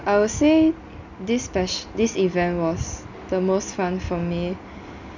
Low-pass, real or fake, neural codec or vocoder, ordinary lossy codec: 7.2 kHz; real; none; none